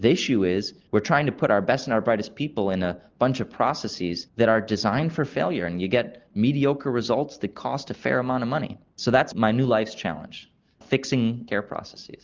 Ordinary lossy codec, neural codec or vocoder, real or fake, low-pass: Opus, 24 kbps; none; real; 7.2 kHz